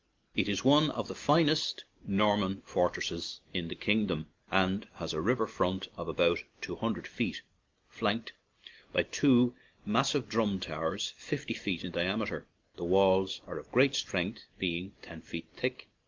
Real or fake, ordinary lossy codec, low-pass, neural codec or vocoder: real; Opus, 32 kbps; 7.2 kHz; none